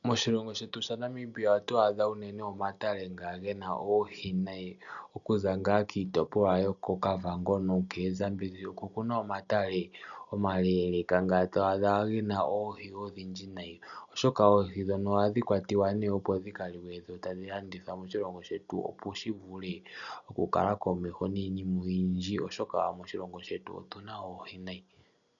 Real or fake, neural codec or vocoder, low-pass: real; none; 7.2 kHz